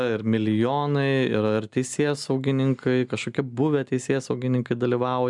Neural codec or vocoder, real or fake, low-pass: none; real; 10.8 kHz